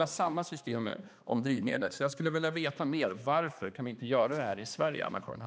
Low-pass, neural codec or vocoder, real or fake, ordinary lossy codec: none; codec, 16 kHz, 2 kbps, X-Codec, HuBERT features, trained on balanced general audio; fake; none